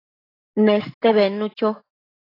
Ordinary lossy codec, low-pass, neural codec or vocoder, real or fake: AAC, 24 kbps; 5.4 kHz; autoencoder, 48 kHz, 128 numbers a frame, DAC-VAE, trained on Japanese speech; fake